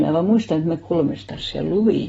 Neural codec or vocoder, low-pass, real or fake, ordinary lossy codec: none; 19.8 kHz; real; AAC, 24 kbps